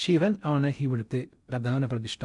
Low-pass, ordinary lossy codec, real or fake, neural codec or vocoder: 10.8 kHz; none; fake; codec, 16 kHz in and 24 kHz out, 0.6 kbps, FocalCodec, streaming, 4096 codes